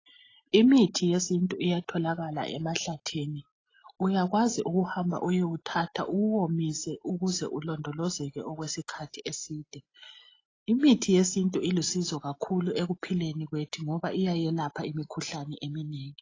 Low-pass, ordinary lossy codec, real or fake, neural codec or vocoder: 7.2 kHz; AAC, 32 kbps; real; none